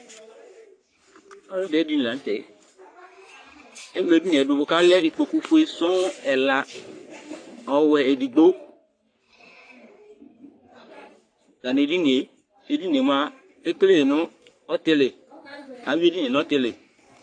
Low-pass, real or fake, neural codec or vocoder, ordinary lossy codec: 9.9 kHz; fake; codec, 44.1 kHz, 3.4 kbps, Pupu-Codec; AAC, 48 kbps